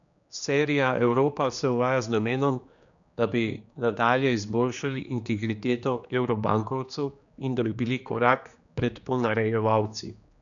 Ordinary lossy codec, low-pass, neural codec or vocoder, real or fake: none; 7.2 kHz; codec, 16 kHz, 2 kbps, X-Codec, HuBERT features, trained on general audio; fake